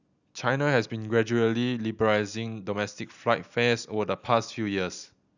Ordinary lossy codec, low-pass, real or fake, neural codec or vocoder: none; 7.2 kHz; real; none